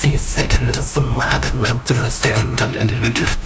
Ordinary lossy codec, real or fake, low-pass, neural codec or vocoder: none; fake; none; codec, 16 kHz, 0.5 kbps, FunCodec, trained on LibriTTS, 25 frames a second